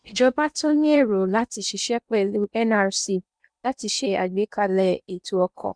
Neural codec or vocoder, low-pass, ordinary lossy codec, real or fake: codec, 16 kHz in and 24 kHz out, 0.8 kbps, FocalCodec, streaming, 65536 codes; 9.9 kHz; none; fake